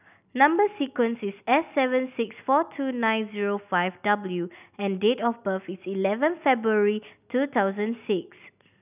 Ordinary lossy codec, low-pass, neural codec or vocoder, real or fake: none; 3.6 kHz; none; real